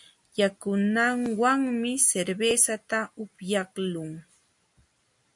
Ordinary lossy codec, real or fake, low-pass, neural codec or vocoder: MP3, 64 kbps; real; 10.8 kHz; none